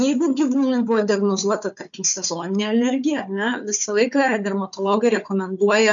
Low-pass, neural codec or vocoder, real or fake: 7.2 kHz; codec, 16 kHz, 4 kbps, FunCodec, trained on Chinese and English, 50 frames a second; fake